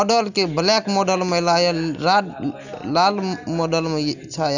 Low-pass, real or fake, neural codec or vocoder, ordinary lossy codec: 7.2 kHz; real; none; none